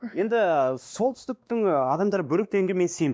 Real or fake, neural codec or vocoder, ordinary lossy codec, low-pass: fake; codec, 16 kHz, 2 kbps, X-Codec, WavLM features, trained on Multilingual LibriSpeech; none; none